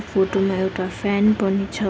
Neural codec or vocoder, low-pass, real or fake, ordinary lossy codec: none; none; real; none